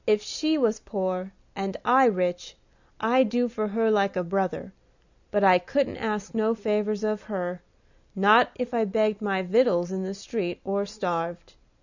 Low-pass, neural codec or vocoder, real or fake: 7.2 kHz; none; real